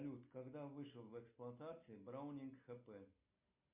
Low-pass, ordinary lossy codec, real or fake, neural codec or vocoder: 3.6 kHz; MP3, 32 kbps; real; none